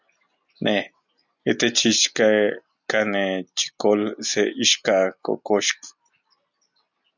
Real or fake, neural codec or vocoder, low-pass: real; none; 7.2 kHz